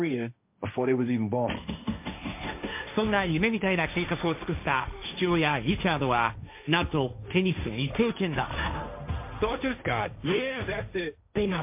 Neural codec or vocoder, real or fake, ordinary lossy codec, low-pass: codec, 16 kHz, 1.1 kbps, Voila-Tokenizer; fake; MP3, 32 kbps; 3.6 kHz